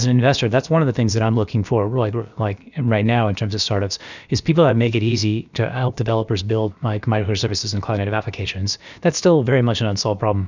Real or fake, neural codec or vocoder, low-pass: fake; codec, 16 kHz, about 1 kbps, DyCAST, with the encoder's durations; 7.2 kHz